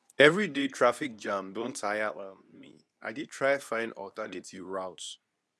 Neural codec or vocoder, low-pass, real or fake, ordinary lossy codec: codec, 24 kHz, 0.9 kbps, WavTokenizer, medium speech release version 2; none; fake; none